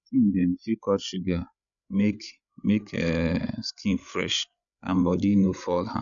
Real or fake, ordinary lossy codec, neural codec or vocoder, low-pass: fake; none; codec, 16 kHz, 8 kbps, FreqCodec, larger model; 7.2 kHz